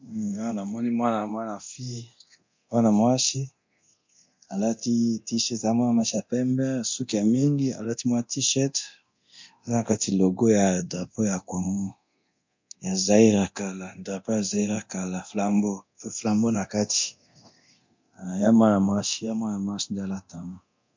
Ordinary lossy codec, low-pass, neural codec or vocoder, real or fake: MP3, 48 kbps; 7.2 kHz; codec, 24 kHz, 0.9 kbps, DualCodec; fake